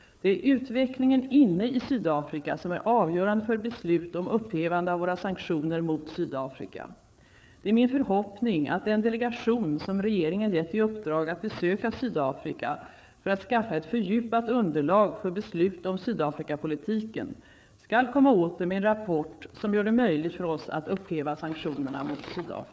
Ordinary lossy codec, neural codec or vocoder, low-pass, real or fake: none; codec, 16 kHz, 4 kbps, FreqCodec, larger model; none; fake